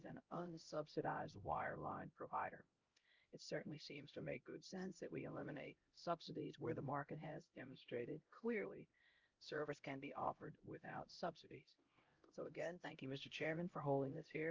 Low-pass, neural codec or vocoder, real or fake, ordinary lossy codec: 7.2 kHz; codec, 16 kHz, 0.5 kbps, X-Codec, HuBERT features, trained on LibriSpeech; fake; Opus, 24 kbps